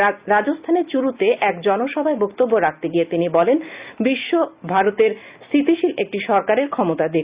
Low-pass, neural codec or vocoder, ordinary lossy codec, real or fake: 3.6 kHz; none; Opus, 64 kbps; real